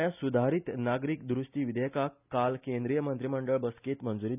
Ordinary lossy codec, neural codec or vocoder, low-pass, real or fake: none; none; 3.6 kHz; real